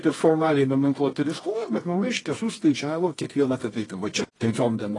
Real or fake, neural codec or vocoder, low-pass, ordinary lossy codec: fake; codec, 24 kHz, 0.9 kbps, WavTokenizer, medium music audio release; 10.8 kHz; AAC, 32 kbps